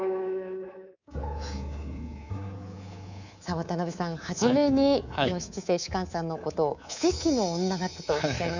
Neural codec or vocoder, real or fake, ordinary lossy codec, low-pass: codec, 24 kHz, 3.1 kbps, DualCodec; fake; none; 7.2 kHz